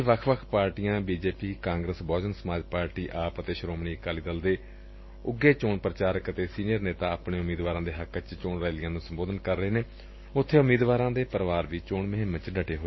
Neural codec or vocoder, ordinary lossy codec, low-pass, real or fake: none; MP3, 24 kbps; 7.2 kHz; real